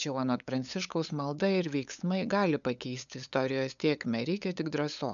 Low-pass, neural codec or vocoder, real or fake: 7.2 kHz; codec, 16 kHz, 8 kbps, FunCodec, trained on LibriTTS, 25 frames a second; fake